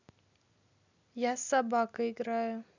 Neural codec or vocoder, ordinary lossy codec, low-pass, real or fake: none; none; 7.2 kHz; real